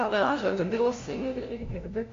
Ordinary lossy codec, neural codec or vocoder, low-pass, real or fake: AAC, 48 kbps; codec, 16 kHz, 0.5 kbps, FunCodec, trained on LibriTTS, 25 frames a second; 7.2 kHz; fake